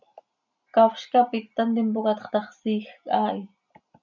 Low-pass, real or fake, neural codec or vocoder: 7.2 kHz; real; none